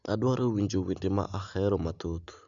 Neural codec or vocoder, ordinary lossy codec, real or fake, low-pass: none; Opus, 64 kbps; real; 7.2 kHz